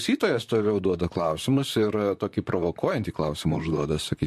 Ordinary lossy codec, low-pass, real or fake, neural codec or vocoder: MP3, 64 kbps; 14.4 kHz; fake; vocoder, 44.1 kHz, 128 mel bands, Pupu-Vocoder